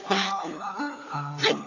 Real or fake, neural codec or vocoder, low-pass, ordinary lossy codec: fake; codec, 16 kHz, 4 kbps, FunCodec, trained on LibriTTS, 50 frames a second; 7.2 kHz; AAC, 32 kbps